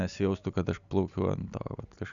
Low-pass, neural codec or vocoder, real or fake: 7.2 kHz; none; real